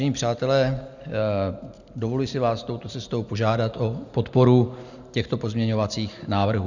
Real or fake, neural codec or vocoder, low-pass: real; none; 7.2 kHz